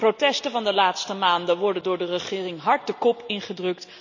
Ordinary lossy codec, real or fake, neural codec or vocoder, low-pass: none; real; none; 7.2 kHz